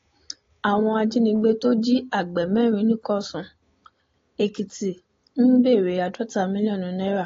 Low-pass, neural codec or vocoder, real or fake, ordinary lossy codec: 7.2 kHz; none; real; AAC, 32 kbps